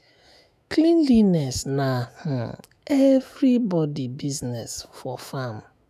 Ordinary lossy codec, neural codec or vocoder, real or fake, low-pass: none; autoencoder, 48 kHz, 128 numbers a frame, DAC-VAE, trained on Japanese speech; fake; 14.4 kHz